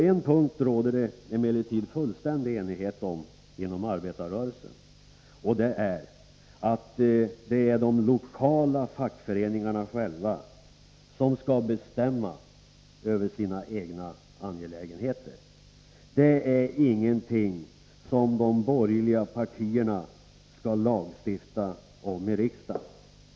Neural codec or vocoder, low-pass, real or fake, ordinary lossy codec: none; none; real; none